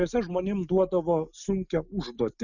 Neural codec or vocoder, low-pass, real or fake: none; 7.2 kHz; real